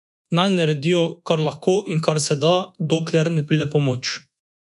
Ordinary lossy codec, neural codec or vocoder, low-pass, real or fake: none; codec, 24 kHz, 1.2 kbps, DualCodec; 10.8 kHz; fake